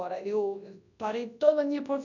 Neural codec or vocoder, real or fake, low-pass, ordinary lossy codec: codec, 24 kHz, 0.9 kbps, WavTokenizer, large speech release; fake; 7.2 kHz; none